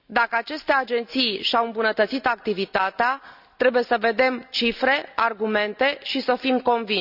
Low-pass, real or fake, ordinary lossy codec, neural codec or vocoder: 5.4 kHz; real; none; none